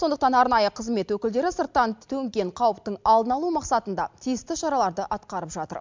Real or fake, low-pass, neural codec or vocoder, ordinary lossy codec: real; 7.2 kHz; none; MP3, 64 kbps